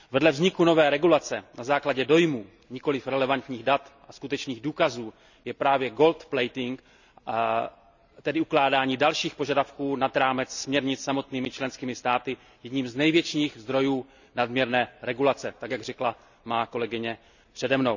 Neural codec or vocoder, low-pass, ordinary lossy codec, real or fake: none; 7.2 kHz; none; real